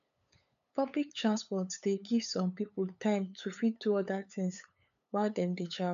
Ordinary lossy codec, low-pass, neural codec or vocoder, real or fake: none; 7.2 kHz; codec, 16 kHz, 8 kbps, FunCodec, trained on LibriTTS, 25 frames a second; fake